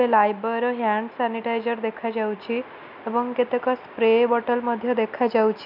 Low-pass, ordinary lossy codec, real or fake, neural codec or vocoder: 5.4 kHz; none; real; none